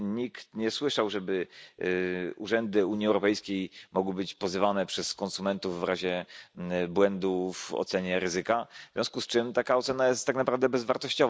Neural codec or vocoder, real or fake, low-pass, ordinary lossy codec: none; real; none; none